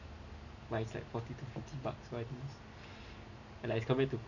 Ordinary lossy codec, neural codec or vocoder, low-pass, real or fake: none; none; 7.2 kHz; real